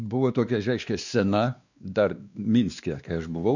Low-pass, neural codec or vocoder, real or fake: 7.2 kHz; codec, 16 kHz, 2 kbps, X-Codec, WavLM features, trained on Multilingual LibriSpeech; fake